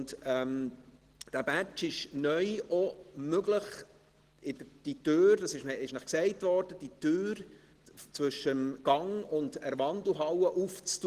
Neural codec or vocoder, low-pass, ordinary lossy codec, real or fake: none; 14.4 kHz; Opus, 16 kbps; real